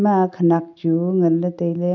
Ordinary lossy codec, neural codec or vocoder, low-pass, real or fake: none; none; 7.2 kHz; real